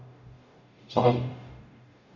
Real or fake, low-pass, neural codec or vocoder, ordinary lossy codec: fake; 7.2 kHz; codec, 44.1 kHz, 0.9 kbps, DAC; none